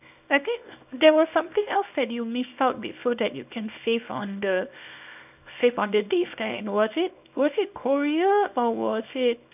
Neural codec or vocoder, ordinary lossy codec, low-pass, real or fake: codec, 24 kHz, 0.9 kbps, WavTokenizer, small release; none; 3.6 kHz; fake